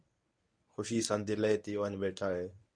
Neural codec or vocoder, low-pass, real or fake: codec, 24 kHz, 0.9 kbps, WavTokenizer, medium speech release version 1; 9.9 kHz; fake